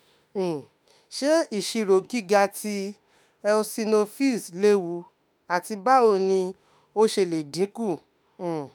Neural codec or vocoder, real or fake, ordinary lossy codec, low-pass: autoencoder, 48 kHz, 32 numbers a frame, DAC-VAE, trained on Japanese speech; fake; none; none